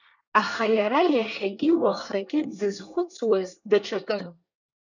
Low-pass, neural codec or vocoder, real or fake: 7.2 kHz; codec, 24 kHz, 1 kbps, SNAC; fake